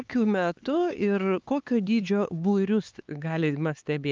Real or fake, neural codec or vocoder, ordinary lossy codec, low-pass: fake; codec, 16 kHz, 4 kbps, X-Codec, HuBERT features, trained on LibriSpeech; Opus, 24 kbps; 7.2 kHz